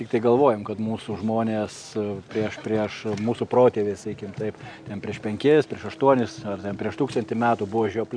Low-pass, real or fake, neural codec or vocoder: 9.9 kHz; real; none